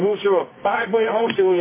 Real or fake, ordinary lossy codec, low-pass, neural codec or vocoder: fake; MP3, 16 kbps; 3.6 kHz; codec, 24 kHz, 0.9 kbps, WavTokenizer, medium music audio release